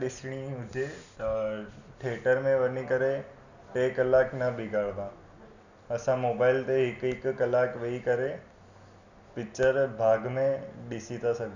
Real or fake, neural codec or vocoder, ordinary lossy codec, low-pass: real; none; none; 7.2 kHz